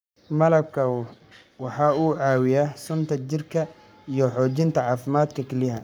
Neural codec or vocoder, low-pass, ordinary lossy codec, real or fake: codec, 44.1 kHz, 7.8 kbps, Pupu-Codec; none; none; fake